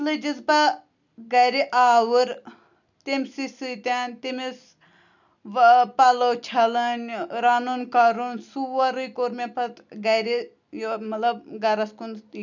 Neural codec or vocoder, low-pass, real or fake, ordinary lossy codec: none; 7.2 kHz; real; none